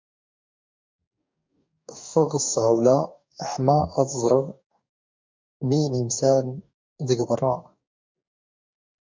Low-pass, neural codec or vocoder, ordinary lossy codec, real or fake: 7.2 kHz; codec, 44.1 kHz, 2.6 kbps, DAC; MP3, 64 kbps; fake